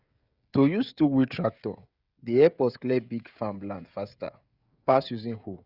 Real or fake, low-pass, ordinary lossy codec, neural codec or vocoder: fake; 5.4 kHz; Opus, 64 kbps; codec, 16 kHz, 16 kbps, FreqCodec, smaller model